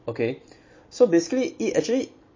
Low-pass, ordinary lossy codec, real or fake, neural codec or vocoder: 7.2 kHz; MP3, 32 kbps; real; none